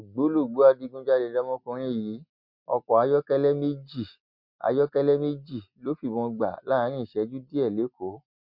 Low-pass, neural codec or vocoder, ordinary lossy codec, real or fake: 5.4 kHz; none; MP3, 48 kbps; real